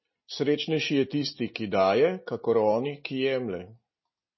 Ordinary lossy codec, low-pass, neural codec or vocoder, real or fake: MP3, 24 kbps; 7.2 kHz; none; real